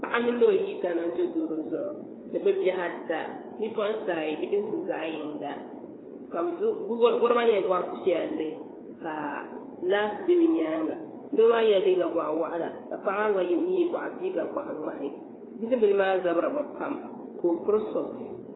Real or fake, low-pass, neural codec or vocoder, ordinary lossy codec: fake; 7.2 kHz; codec, 16 kHz, 4 kbps, FreqCodec, larger model; AAC, 16 kbps